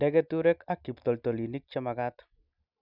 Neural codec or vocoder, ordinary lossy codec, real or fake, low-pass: none; none; real; 5.4 kHz